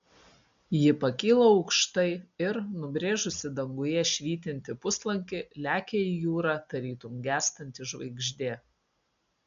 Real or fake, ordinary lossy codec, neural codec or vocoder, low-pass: real; MP3, 64 kbps; none; 7.2 kHz